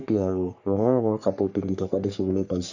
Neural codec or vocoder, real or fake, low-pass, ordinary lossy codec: codec, 44.1 kHz, 3.4 kbps, Pupu-Codec; fake; 7.2 kHz; none